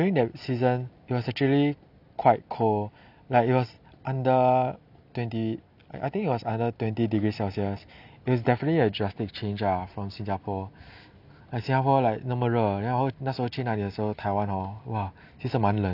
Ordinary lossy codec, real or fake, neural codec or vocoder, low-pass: none; real; none; 5.4 kHz